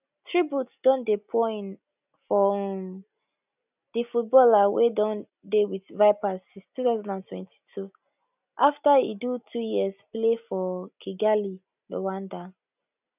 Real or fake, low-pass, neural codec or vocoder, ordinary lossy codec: real; 3.6 kHz; none; none